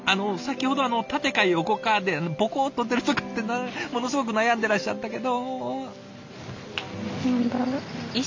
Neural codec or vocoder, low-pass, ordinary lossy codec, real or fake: none; 7.2 kHz; MP3, 48 kbps; real